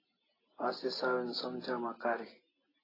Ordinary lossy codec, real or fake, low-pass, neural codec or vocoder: AAC, 24 kbps; real; 5.4 kHz; none